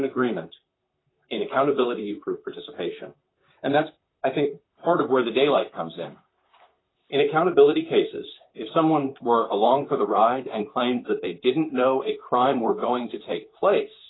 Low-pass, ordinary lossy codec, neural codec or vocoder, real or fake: 7.2 kHz; AAC, 16 kbps; vocoder, 44.1 kHz, 128 mel bands, Pupu-Vocoder; fake